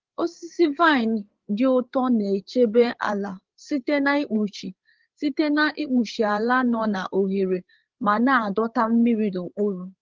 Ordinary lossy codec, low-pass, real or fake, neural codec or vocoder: Opus, 16 kbps; 7.2 kHz; fake; codec, 16 kHz, 8 kbps, FreqCodec, larger model